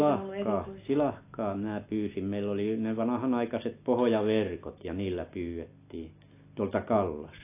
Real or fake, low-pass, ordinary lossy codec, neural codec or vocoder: real; 3.6 kHz; AAC, 24 kbps; none